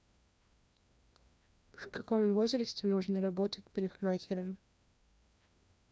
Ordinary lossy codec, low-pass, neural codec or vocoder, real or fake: none; none; codec, 16 kHz, 1 kbps, FreqCodec, larger model; fake